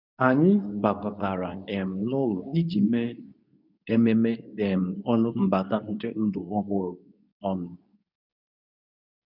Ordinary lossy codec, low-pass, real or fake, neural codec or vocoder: none; 5.4 kHz; fake; codec, 24 kHz, 0.9 kbps, WavTokenizer, medium speech release version 2